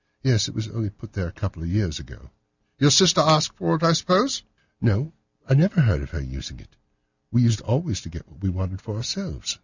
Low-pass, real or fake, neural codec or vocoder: 7.2 kHz; real; none